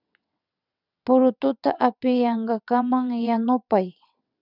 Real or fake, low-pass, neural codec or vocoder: fake; 5.4 kHz; vocoder, 22.05 kHz, 80 mel bands, WaveNeXt